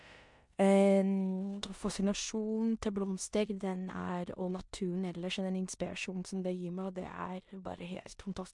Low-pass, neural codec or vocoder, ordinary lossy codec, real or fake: 10.8 kHz; codec, 16 kHz in and 24 kHz out, 0.9 kbps, LongCat-Audio-Codec, four codebook decoder; MP3, 64 kbps; fake